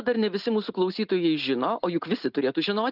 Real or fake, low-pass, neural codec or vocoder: real; 5.4 kHz; none